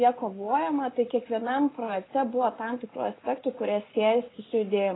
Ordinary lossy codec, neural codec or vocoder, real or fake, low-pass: AAC, 16 kbps; none; real; 7.2 kHz